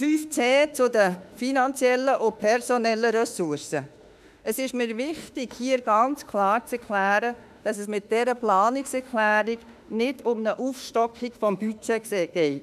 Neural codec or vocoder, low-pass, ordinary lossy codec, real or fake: autoencoder, 48 kHz, 32 numbers a frame, DAC-VAE, trained on Japanese speech; 14.4 kHz; none; fake